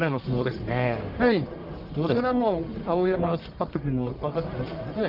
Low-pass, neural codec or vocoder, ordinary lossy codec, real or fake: 5.4 kHz; codec, 44.1 kHz, 1.7 kbps, Pupu-Codec; Opus, 32 kbps; fake